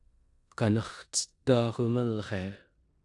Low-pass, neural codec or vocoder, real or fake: 10.8 kHz; codec, 16 kHz in and 24 kHz out, 0.9 kbps, LongCat-Audio-Codec, four codebook decoder; fake